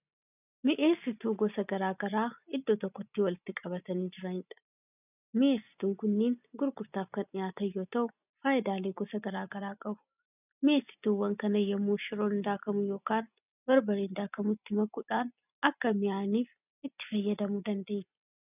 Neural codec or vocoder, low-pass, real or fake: none; 3.6 kHz; real